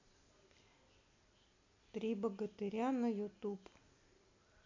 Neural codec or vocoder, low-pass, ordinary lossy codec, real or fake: none; 7.2 kHz; MP3, 64 kbps; real